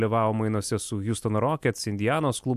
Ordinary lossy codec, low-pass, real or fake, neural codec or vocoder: AAC, 96 kbps; 14.4 kHz; real; none